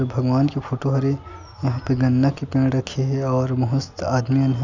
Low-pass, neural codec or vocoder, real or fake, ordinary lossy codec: 7.2 kHz; none; real; none